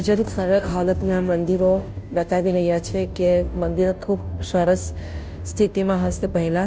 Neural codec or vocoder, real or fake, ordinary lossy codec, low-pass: codec, 16 kHz, 0.5 kbps, FunCodec, trained on Chinese and English, 25 frames a second; fake; none; none